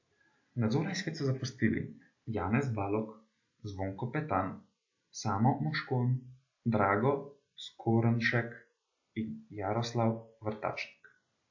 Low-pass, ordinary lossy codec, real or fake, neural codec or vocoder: 7.2 kHz; AAC, 48 kbps; real; none